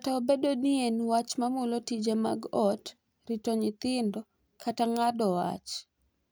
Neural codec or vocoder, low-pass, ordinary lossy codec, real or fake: none; none; none; real